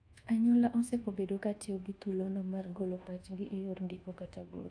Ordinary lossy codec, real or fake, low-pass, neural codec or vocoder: AAC, 64 kbps; fake; 9.9 kHz; codec, 24 kHz, 1.2 kbps, DualCodec